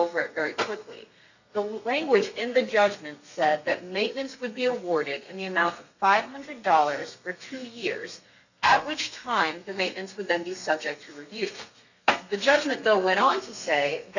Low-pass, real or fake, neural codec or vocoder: 7.2 kHz; fake; codec, 32 kHz, 1.9 kbps, SNAC